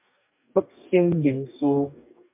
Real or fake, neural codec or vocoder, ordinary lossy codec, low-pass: fake; codec, 44.1 kHz, 2.6 kbps, DAC; MP3, 32 kbps; 3.6 kHz